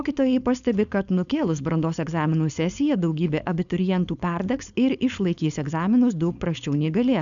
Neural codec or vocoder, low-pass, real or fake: codec, 16 kHz, 4.8 kbps, FACodec; 7.2 kHz; fake